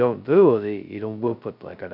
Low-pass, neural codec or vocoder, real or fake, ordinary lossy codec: 5.4 kHz; codec, 16 kHz, 0.2 kbps, FocalCodec; fake; none